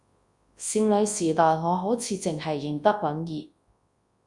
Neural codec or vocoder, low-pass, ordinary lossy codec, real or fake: codec, 24 kHz, 0.9 kbps, WavTokenizer, large speech release; 10.8 kHz; Opus, 64 kbps; fake